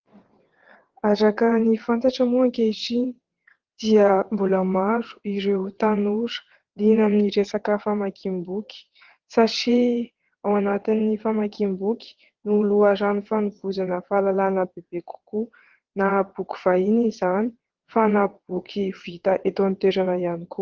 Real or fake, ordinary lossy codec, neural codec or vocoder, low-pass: fake; Opus, 16 kbps; vocoder, 22.05 kHz, 80 mel bands, WaveNeXt; 7.2 kHz